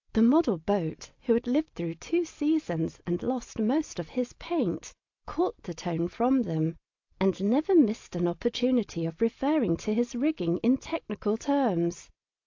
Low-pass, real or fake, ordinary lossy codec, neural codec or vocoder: 7.2 kHz; real; AAC, 48 kbps; none